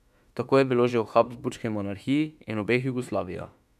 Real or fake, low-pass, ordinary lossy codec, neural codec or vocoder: fake; 14.4 kHz; none; autoencoder, 48 kHz, 32 numbers a frame, DAC-VAE, trained on Japanese speech